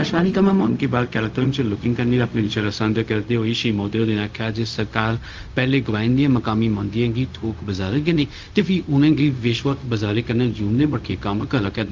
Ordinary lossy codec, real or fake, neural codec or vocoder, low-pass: Opus, 24 kbps; fake; codec, 16 kHz, 0.4 kbps, LongCat-Audio-Codec; 7.2 kHz